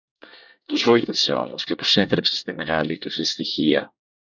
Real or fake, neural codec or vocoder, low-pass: fake; codec, 24 kHz, 1 kbps, SNAC; 7.2 kHz